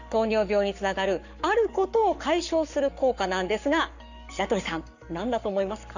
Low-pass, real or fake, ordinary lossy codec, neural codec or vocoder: 7.2 kHz; fake; none; codec, 44.1 kHz, 7.8 kbps, Pupu-Codec